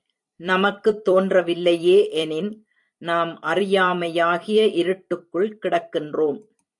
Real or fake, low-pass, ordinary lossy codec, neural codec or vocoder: fake; 10.8 kHz; AAC, 64 kbps; vocoder, 44.1 kHz, 128 mel bands every 256 samples, BigVGAN v2